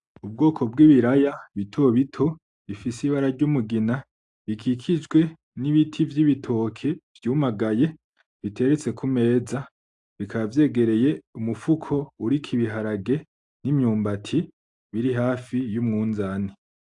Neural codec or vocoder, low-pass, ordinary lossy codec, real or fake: none; 10.8 kHz; AAC, 64 kbps; real